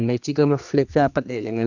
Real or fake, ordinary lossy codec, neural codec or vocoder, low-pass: fake; none; codec, 16 kHz, 2 kbps, X-Codec, HuBERT features, trained on general audio; 7.2 kHz